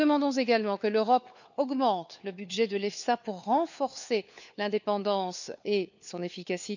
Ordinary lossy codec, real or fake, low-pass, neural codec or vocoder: none; fake; 7.2 kHz; codec, 16 kHz, 4 kbps, FunCodec, trained on LibriTTS, 50 frames a second